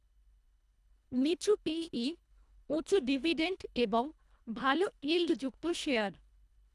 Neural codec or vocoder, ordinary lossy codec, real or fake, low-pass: codec, 24 kHz, 1.5 kbps, HILCodec; none; fake; none